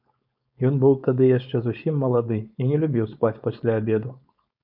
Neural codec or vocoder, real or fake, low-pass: codec, 16 kHz, 4.8 kbps, FACodec; fake; 5.4 kHz